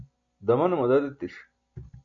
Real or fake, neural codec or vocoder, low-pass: real; none; 7.2 kHz